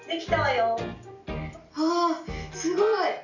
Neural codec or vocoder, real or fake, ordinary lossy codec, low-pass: none; real; none; 7.2 kHz